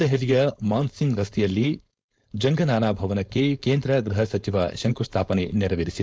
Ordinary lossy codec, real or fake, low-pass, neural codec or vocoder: none; fake; none; codec, 16 kHz, 4.8 kbps, FACodec